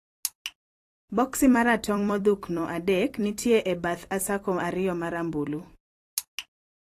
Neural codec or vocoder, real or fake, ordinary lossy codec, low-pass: vocoder, 44.1 kHz, 128 mel bands every 256 samples, BigVGAN v2; fake; AAC, 48 kbps; 14.4 kHz